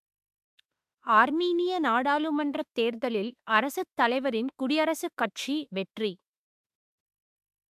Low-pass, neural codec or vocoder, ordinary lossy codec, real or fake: 14.4 kHz; autoencoder, 48 kHz, 32 numbers a frame, DAC-VAE, trained on Japanese speech; AAC, 96 kbps; fake